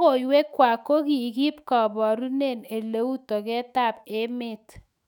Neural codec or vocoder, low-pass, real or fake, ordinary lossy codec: autoencoder, 48 kHz, 128 numbers a frame, DAC-VAE, trained on Japanese speech; 19.8 kHz; fake; none